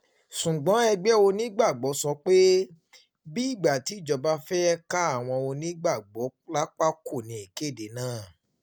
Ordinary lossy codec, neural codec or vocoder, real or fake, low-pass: none; none; real; none